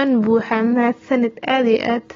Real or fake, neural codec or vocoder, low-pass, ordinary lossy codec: real; none; 7.2 kHz; AAC, 24 kbps